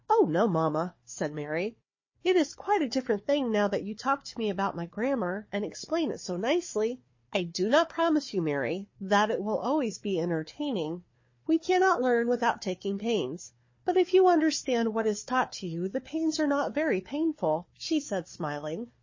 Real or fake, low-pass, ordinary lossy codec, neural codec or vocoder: fake; 7.2 kHz; MP3, 32 kbps; codec, 16 kHz, 4 kbps, FunCodec, trained on Chinese and English, 50 frames a second